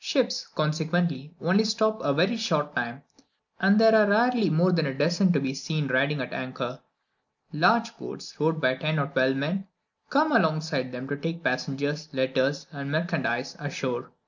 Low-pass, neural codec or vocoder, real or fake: 7.2 kHz; none; real